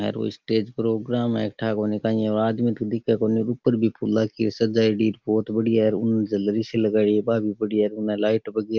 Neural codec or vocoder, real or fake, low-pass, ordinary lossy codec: none; real; 7.2 kHz; Opus, 32 kbps